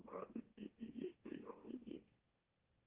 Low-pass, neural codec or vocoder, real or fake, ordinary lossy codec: 3.6 kHz; autoencoder, 44.1 kHz, a latent of 192 numbers a frame, MeloTTS; fake; Opus, 16 kbps